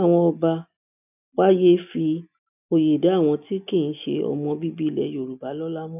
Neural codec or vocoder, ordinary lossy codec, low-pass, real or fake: none; none; 3.6 kHz; real